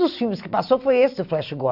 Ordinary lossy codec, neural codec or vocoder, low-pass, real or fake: none; none; 5.4 kHz; real